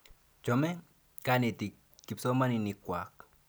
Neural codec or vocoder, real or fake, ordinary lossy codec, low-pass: none; real; none; none